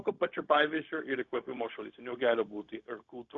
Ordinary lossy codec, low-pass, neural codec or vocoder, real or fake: Opus, 64 kbps; 7.2 kHz; codec, 16 kHz, 0.4 kbps, LongCat-Audio-Codec; fake